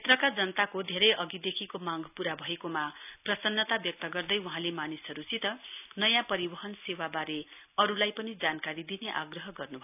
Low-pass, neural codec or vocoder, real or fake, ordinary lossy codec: 3.6 kHz; none; real; none